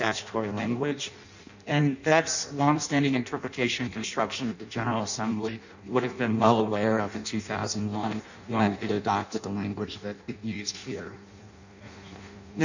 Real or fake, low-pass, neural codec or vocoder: fake; 7.2 kHz; codec, 16 kHz in and 24 kHz out, 0.6 kbps, FireRedTTS-2 codec